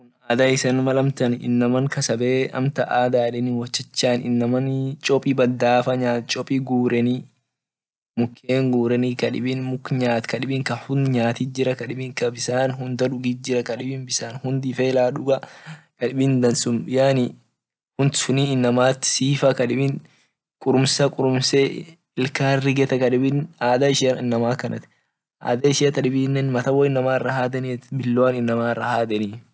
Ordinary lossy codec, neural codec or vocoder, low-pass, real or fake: none; none; none; real